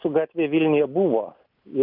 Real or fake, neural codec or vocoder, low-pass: real; none; 5.4 kHz